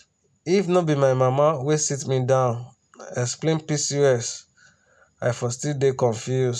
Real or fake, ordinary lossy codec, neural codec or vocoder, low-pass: real; none; none; none